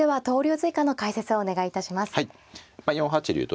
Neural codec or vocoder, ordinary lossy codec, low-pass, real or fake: none; none; none; real